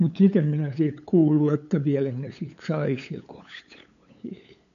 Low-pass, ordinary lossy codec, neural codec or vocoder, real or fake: 7.2 kHz; none; codec, 16 kHz, 8 kbps, FunCodec, trained on LibriTTS, 25 frames a second; fake